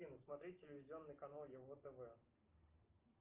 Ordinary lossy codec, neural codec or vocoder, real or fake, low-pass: Opus, 24 kbps; none; real; 3.6 kHz